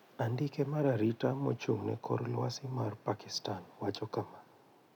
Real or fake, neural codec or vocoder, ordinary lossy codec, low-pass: real; none; none; 19.8 kHz